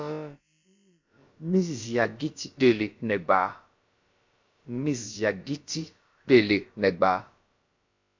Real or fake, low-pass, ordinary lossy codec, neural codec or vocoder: fake; 7.2 kHz; MP3, 48 kbps; codec, 16 kHz, about 1 kbps, DyCAST, with the encoder's durations